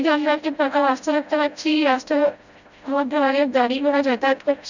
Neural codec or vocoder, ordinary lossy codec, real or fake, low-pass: codec, 16 kHz, 0.5 kbps, FreqCodec, smaller model; none; fake; 7.2 kHz